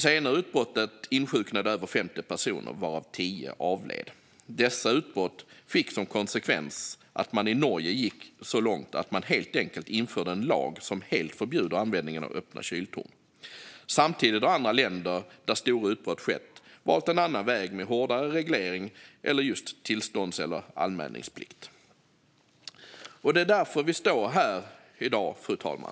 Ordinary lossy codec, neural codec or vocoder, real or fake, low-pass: none; none; real; none